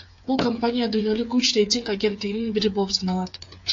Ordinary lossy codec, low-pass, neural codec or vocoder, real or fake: AAC, 48 kbps; 7.2 kHz; codec, 16 kHz, 8 kbps, FreqCodec, smaller model; fake